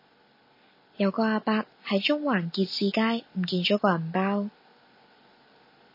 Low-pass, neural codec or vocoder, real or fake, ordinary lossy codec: 5.4 kHz; none; real; MP3, 24 kbps